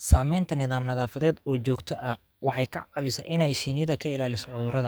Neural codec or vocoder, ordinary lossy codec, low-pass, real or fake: codec, 44.1 kHz, 2.6 kbps, SNAC; none; none; fake